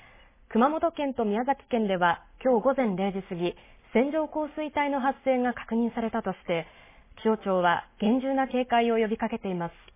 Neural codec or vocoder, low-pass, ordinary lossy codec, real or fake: none; 3.6 kHz; MP3, 16 kbps; real